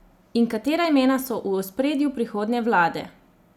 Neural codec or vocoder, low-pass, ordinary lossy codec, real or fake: none; 19.8 kHz; none; real